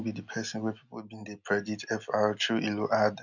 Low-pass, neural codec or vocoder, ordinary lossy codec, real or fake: 7.2 kHz; none; none; real